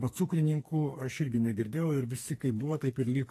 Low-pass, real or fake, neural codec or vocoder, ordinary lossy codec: 14.4 kHz; fake; codec, 44.1 kHz, 2.6 kbps, SNAC; AAC, 48 kbps